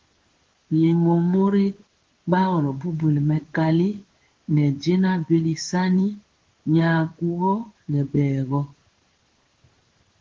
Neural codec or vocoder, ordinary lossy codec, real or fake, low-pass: codec, 16 kHz in and 24 kHz out, 1 kbps, XY-Tokenizer; Opus, 16 kbps; fake; 7.2 kHz